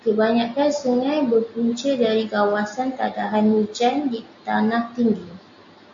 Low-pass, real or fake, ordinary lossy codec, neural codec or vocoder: 7.2 kHz; real; AAC, 64 kbps; none